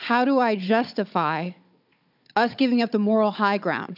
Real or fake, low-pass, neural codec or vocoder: fake; 5.4 kHz; codec, 16 kHz, 16 kbps, FunCodec, trained on Chinese and English, 50 frames a second